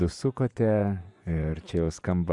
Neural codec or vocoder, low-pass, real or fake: vocoder, 24 kHz, 100 mel bands, Vocos; 10.8 kHz; fake